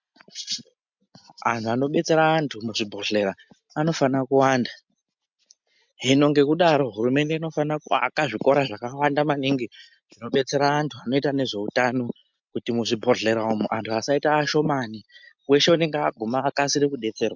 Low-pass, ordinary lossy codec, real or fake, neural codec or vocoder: 7.2 kHz; MP3, 64 kbps; real; none